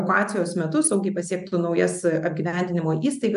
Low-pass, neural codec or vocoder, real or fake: 10.8 kHz; none; real